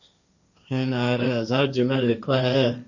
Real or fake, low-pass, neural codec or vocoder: fake; 7.2 kHz; codec, 16 kHz, 1.1 kbps, Voila-Tokenizer